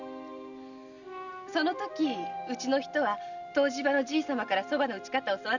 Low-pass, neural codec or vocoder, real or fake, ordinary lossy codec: 7.2 kHz; none; real; none